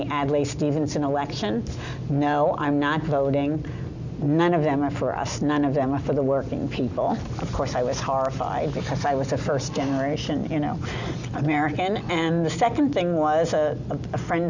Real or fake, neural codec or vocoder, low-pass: real; none; 7.2 kHz